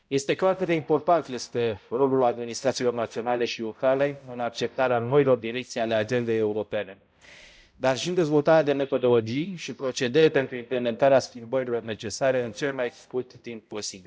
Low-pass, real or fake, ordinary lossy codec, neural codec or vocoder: none; fake; none; codec, 16 kHz, 0.5 kbps, X-Codec, HuBERT features, trained on balanced general audio